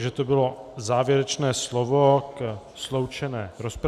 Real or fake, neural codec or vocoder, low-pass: real; none; 14.4 kHz